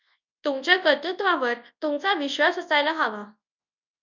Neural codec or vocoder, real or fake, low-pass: codec, 24 kHz, 0.9 kbps, WavTokenizer, large speech release; fake; 7.2 kHz